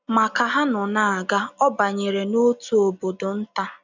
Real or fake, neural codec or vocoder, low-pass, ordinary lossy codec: real; none; 7.2 kHz; none